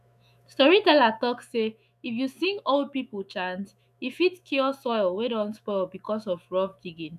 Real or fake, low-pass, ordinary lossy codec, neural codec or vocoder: fake; 14.4 kHz; none; autoencoder, 48 kHz, 128 numbers a frame, DAC-VAE, trained on Japanese speech